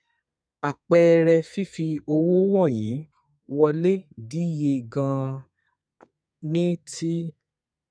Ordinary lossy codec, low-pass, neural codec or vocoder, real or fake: none; 9.9 kHz; codec, 32 kHz, 1.9 kbps, SNAC; fake